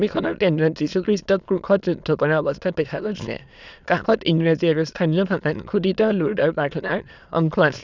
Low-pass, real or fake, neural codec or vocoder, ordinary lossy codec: 7.2 kHz; fake; autoencoder, 22.05 kHz, a latent of 192 numbers a frame, VITS, trained on many speakers; none